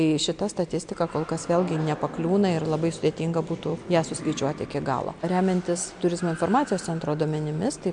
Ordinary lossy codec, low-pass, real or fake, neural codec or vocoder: MP3, 64 kbps; 9.9 kHz; real; none